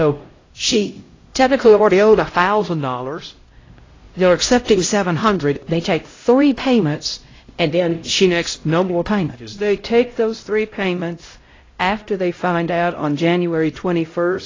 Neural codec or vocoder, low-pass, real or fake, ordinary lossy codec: codec, 16 kHz, 0.5 kbps, X-Codec, HuBERT features, trained on LibriSpeech; 7.2 kHz; fake; AAC, 32 kbps